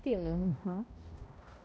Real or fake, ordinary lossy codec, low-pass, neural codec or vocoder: fake; none; none; codec, 16 kHz, 0.5 kbps, X-Codec, HuBERT features, trained on balanced general audio